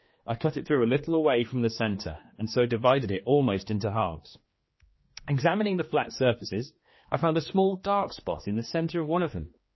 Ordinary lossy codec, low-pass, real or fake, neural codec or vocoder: MP3, 24 kbps; 7.2 kHz; fake; codec, 16 kHz, 2 kbps, X-Codec, HuBERT features, trained on general audio